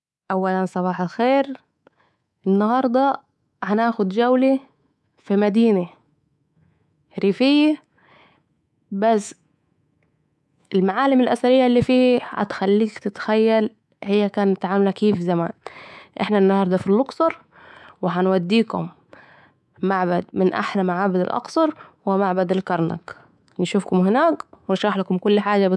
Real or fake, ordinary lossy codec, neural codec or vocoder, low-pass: fake; none; codec, 24 kHz, 3.1 kbps, DualCodec; none